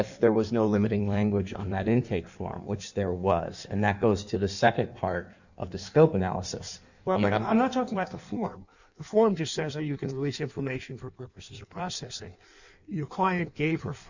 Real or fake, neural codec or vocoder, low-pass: fake; codec, 16 kHz in and 24 kHz out, 1.1 kbps, FireRedTTS-2 codec; 7.2 kHz